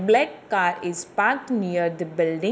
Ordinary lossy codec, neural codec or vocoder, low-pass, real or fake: none; none; none; real